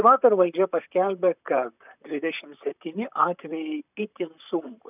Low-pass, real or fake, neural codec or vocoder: 3.6 kHz; fake; vocoder, 44.1 kHz, 128 mel bands, Pupu-Vocoder